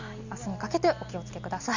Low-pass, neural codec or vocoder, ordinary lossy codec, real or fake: 7.2 kHz; none; none; real